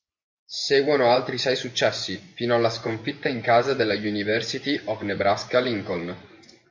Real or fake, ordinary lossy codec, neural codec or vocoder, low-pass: fake; MP3, 48 kbps; vocoder, 24 kHz, 100 mel bands, Vocos; 7.2 kHz